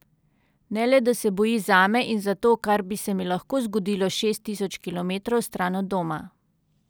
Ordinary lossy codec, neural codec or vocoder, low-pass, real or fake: none; none; none; real